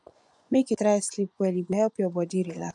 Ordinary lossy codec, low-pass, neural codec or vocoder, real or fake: none; 10.8 kHz; vocoder, 24 kHz, 100 mel bands, Vocos; fake